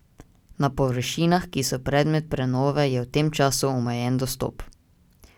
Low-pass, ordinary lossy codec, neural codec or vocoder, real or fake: 19.8 kHz; none; none; real